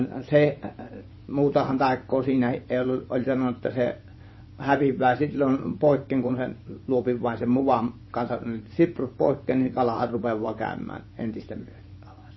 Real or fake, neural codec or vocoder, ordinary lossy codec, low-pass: fake; vocoder, 24 kHz, 100 mel bands, Vocos; MP3, 24 kbps; 7.2 kHz